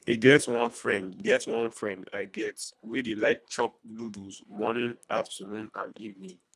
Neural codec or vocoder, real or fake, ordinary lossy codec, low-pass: codec, 24 kHz, 1.5 kbps, HILCodec; fake; none; none